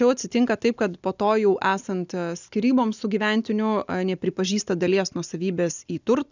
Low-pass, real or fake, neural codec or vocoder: 7.2 kHz; real; none